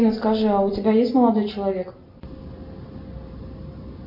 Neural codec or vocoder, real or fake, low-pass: none; real; 5.4 kHz